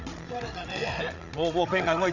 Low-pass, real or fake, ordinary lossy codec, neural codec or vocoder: 7.2 kHz; fake; none; codec, 16 kHz, 16 kbps, FreqCodec, larger model